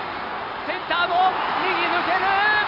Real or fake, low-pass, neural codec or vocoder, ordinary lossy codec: real; 5.4 kHz; none; none